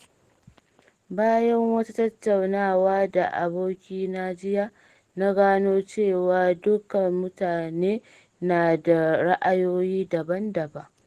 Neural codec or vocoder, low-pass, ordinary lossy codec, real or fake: none; 14.4 kHz; Opus, 16 kbps; real